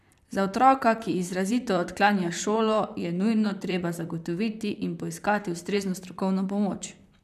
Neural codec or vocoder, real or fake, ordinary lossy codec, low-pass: vocoder, 44.1 kHz, 128 mel bands, Pupu-Vocoder; fake; none; 14.4 kHz